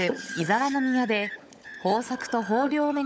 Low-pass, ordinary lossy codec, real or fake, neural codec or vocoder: none; none; fake; codec, 16 kHz, 16 kbps, FunCodec, trained on LibriTTS, 50 frames a second